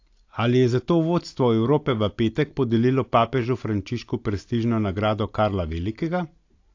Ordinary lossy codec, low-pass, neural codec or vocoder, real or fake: AAC, 48 kbps; 7.2 kHz; none; real